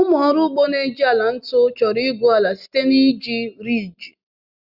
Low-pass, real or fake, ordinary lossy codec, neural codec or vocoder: 5.4 kHz; real; Opus, 64 kbps; none